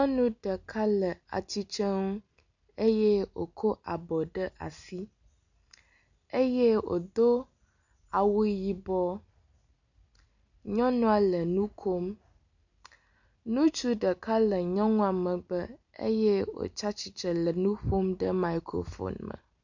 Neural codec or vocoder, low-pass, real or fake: none; 7.2 kHz; real